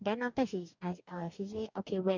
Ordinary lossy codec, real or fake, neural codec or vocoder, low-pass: MP3, 64 kbps; fake; codec, 44.1 kHz, 2.6 kbps, DAC; 7.2 kHz